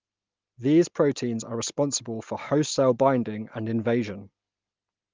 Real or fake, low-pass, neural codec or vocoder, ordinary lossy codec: real; 7.2 kHz; none; Opus, 24 kbps